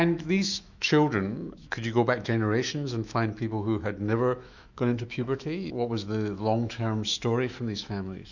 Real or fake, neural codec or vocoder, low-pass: fake; autoencoder, 48 kHz, 128 numbers a frame, DAC-VAE, trained on Japanese speech; 7.2 kHz